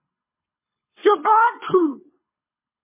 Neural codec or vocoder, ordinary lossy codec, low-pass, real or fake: codec, 24 kHz, 3 kbps, HILCodec; MP3, 16 kbps; 3.6 kHz; fake